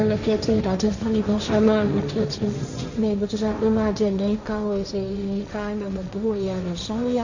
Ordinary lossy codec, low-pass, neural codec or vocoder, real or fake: none; 7.2 kHz; codec, 16 kHz, 1.1 kbps, Voila-Tokenizer; fake